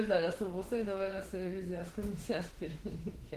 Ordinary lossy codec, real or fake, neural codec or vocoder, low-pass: Opus, 16 kbps; fake; autoencoder, 48 kHz, 32 numbers a frame, DAC-VAE, trained on Japanese speech; 14.4 kHz